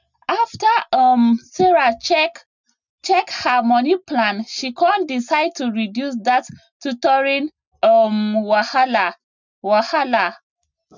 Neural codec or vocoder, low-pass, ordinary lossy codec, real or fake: none; 7.2 kHz; none; real